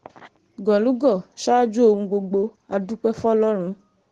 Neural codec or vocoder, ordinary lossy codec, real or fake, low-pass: none; Opus, 16 kbps; real; 10.8 kHz